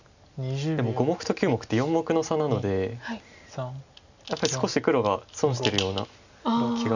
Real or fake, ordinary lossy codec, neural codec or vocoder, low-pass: real; none; none; 7.2 kHz